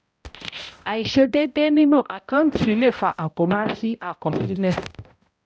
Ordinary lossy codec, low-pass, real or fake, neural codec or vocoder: none; none; fake; codec, 16 kHz, 0.5 kbps, X-Codec, HuBERT features, trained on balanced general audio